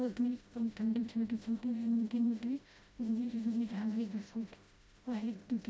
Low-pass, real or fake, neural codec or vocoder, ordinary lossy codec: none; fake; codec, 16 kHz, 0.5 kbps, FreqCodec, smaller model; none